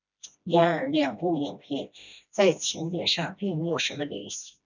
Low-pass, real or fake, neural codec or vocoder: 7.2 kHz; fake; codec, 16 kHz, 1 kbps, FreqCodec, smaller model